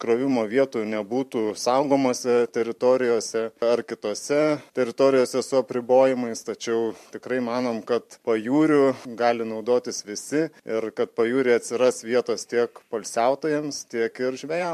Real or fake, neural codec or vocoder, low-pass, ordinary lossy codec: fake; vocoder, 44.1 kHz, 128 mel bands every 512 samples, BigVGAN v2; 14.4 kHz; MP3, 64 kbps